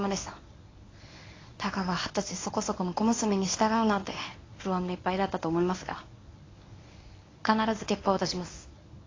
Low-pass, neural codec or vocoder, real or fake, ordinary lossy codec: 7.2 kHz; codec, 24 kHz, 0.9 kbps, WavTokenizer, medium speech release version 1; fake; AAC, 32 kbps